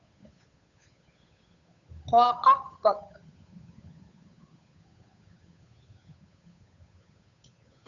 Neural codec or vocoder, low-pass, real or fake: codec, 16 kHz, 8 kbps, FunCodec, trained on Chinese and English, 25 frames a second; 7.2 kHz; fake